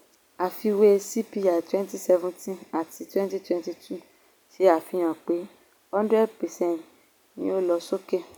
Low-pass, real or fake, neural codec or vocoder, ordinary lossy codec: none; real; none; none